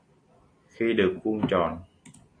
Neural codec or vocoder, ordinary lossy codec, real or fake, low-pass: none; AAC, 32 kbps; real; 9.9 kHz